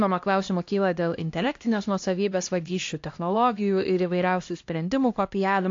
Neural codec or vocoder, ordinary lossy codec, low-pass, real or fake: codec, 16 kHz, 1 kbps, X-Codec, HuBERT features, trained on LibriSpeech; AAC, 48 kbps; 7.2 kHz; fake